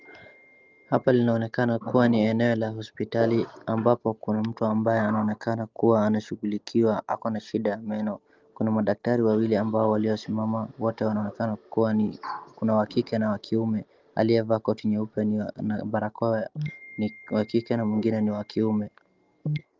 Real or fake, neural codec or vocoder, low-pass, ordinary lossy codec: real; none; 7.2 kHz; Opus, 32 kbps